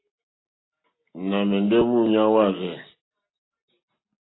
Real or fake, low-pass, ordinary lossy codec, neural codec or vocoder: real; 7.2 kHz; AAC, 16 kbps; none